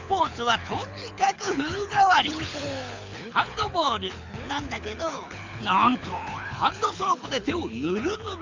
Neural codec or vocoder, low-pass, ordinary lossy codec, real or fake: codec, 24 kHz, 6 kbps, HILCodec; 7.2 kHz; MP3, 64 kbps; fake